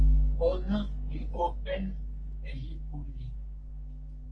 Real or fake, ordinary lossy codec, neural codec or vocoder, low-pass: fake; Opus, 24 kbps; codec, 44.1 kHz, 3.4 kbps, Pupu-Codec; 9.9 kHz